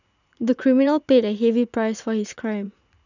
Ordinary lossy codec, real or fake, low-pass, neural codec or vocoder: none; fake; 7.2 kHz; vocoder, 44.1 kHz, 80 mel bands, Vocos